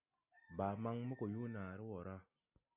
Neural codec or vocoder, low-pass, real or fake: none; 3.6 kHz; real